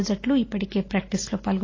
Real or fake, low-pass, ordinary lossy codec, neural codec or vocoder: real; 7.2 kHz; AAC, 32 kbps; none